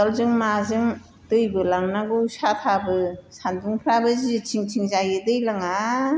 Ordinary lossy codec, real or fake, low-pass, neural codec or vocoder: none; real; none; none